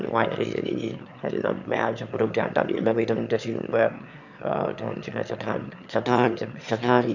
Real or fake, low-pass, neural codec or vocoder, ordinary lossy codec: fake; 7.2 kHz; autoencoder, 22.05 kHz, a latent of 192 numbers a frame, VITS, trained on one speaker; none